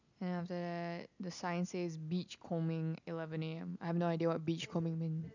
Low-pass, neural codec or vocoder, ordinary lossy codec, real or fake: 7.2 kHz; none; none; real